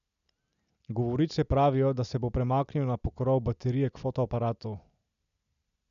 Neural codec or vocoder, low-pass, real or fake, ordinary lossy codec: none; 7.2 kHz; real; none